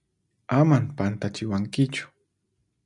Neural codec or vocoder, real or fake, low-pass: none; real; 10.8 kHz